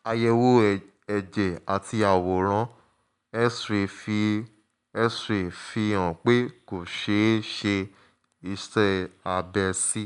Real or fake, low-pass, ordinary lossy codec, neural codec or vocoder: real; 10.8 kHz; MP3, 96 kbps; none